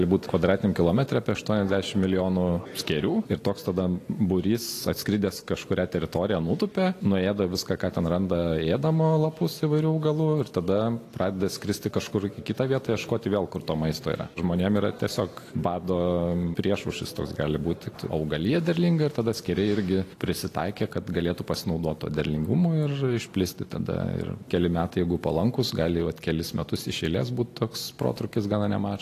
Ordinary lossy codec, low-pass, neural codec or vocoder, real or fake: AAC, 48 kbps; 14.4 kHz; none; real